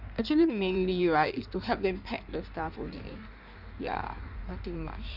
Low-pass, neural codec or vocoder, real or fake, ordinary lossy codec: 5.4 kHz; codec, 16 kHz in and 24 kHz out, 1.1 kbps, FireRedTTS-2 codec; fake; none